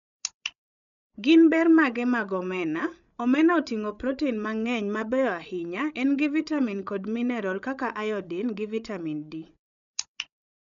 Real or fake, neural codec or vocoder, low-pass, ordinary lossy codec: fake; codec, 16 kHz, 16 kbps, FreqCodec, larger model; 7.2 kHz; none